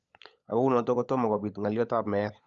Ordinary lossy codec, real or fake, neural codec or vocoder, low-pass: Opus, 64 kbps; fake; codec, 16 kHz, 16 kbps, FunCodec, trained on LibriTTS, 50 frames a second; 7.2 kHz